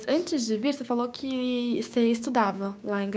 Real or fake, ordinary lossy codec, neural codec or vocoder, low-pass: fake; none; codec, 16 kHz, 6 kbps, DAC; none